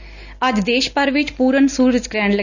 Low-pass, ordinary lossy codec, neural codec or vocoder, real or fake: 7.2 kHz; none; none; real